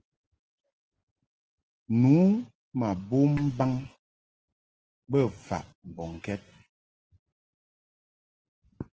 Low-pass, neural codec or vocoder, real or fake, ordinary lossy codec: 7.2 kHz; none; real; Opus, 16 kbps